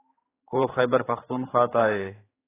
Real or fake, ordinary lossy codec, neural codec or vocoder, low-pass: fake; AAC, 16 kbps; codec, 16 kHz, 4 kbps, X-Codec, HuBERT features, trained on general audio; 3.6 kHz